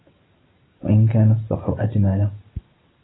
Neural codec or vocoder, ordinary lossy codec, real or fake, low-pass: none; AAC, 16 kbps; real; 7.2 kHz